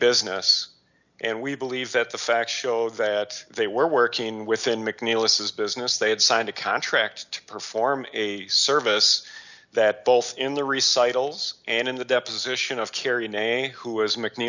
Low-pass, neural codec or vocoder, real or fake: 7.2 kHz; none; real